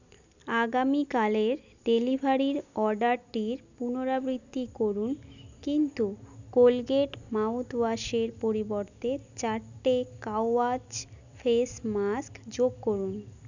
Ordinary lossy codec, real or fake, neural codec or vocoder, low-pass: none; real; none; 7.2 kHz